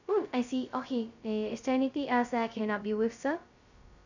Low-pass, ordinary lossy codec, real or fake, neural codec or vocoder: 7.2 kHz; none; fake; codec, 16 kHz, 0.2 kbps, FocalCodec